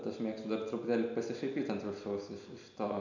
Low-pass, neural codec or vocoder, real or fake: 7.2 kHz; none; real